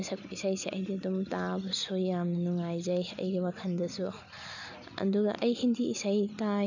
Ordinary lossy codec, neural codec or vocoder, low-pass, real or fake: none; none; 7.2 kHz; real